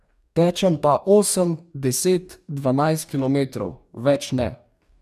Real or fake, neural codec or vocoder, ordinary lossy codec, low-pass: fake; codec, 44.1 kHz, 2.6 kbps, DAC; none; 14.4 kHz